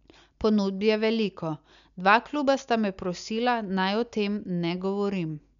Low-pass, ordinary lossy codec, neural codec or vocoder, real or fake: 7.2 kHz; none; none; real